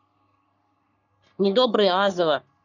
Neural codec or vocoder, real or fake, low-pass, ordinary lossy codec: codec, 44.1 kHz, 3.4 kbps, Pupu-Codec; fake; 7.2 kHz; none